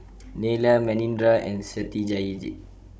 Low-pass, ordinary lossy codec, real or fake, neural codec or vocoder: none; none; fake; codec, 16 kHz, 16 kbps, FunCodec, trained on Chinese and English, 50 frames a second